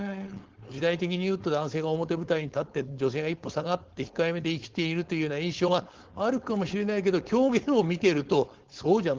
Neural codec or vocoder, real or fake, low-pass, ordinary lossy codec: codec, 16 kHz, 4.8 kbps, FACodec; fake; 7.2 kHz; Opus, 16 kbps